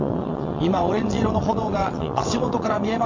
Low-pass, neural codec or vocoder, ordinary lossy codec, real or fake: 7.2 kHz; vocoder, 22.05 kHz, 80 mel bands, WaveNeXt; MP3, 48 kbps; fake